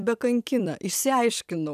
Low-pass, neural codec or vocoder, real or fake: 14.4 kHz; vocoder, 44.1 kHz, 128 mel bands every 256 samples, BigVGAN v2; fake